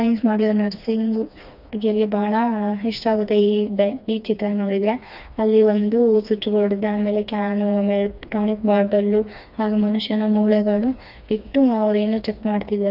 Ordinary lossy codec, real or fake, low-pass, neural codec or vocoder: none; fake; 5.4 kHz; codec, 16 kHz, 2 kbps, FreqCodec, smaller model